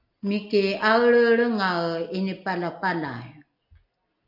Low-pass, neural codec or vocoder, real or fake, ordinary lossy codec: 5.4 kHz; none; real; AAC, 48 kbps